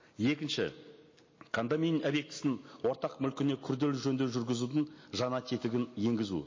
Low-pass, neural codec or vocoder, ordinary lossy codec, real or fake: 7.2 kHz; none; MP3, 32 kbps; real